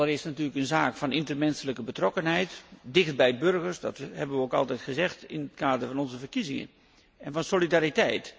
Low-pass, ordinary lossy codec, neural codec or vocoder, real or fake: none; none; none; real